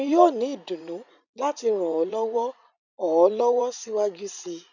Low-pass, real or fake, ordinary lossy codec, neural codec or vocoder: 7.2 kHz; fake; none; vocoder, 44.1 kHz, 80 mel bands, Vocos